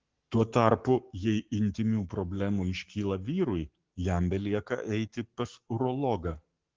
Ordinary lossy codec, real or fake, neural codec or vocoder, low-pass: Opus, 16 kbps; fake; codec, 44.1 kHz, 7.8 kbps, Pupu-Codec; 7.2 kHz